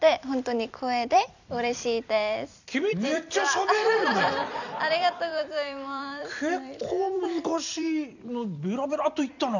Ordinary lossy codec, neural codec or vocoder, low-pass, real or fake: none; vocoder, 44.1 kHz, 128 mel bands every 256 samples, BigVGAN v2; 7.2 kHz; fake